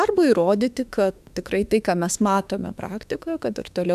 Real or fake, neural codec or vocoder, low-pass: fake; autoencoder, 48 kHz, 32 numbers a frame, DAC-VAE, trained on Japanese speech; 14.4 kHz